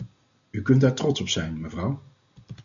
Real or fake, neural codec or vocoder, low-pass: real; none; 7.2 kHz